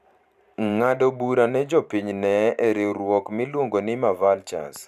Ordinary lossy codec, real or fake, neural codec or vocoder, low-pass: none; fake; vocoder, 44.1 kHz, 128 mel bands every 512 samples, BigVGAN v2; 14.4 kHz